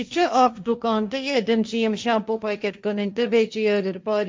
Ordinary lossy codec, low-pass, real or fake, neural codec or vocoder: none; none; fake; codec, 16 kHz, 1.1 kbps, Voila-Tokenizer